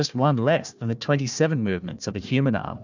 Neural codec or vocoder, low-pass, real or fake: codec, 16 kHz, 1 kbps, FunCodec, trained on Chinese and English, 50 frames a second; 7.2 kHz; fake